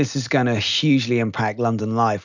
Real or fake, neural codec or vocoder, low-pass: real; none; 7.2 kHz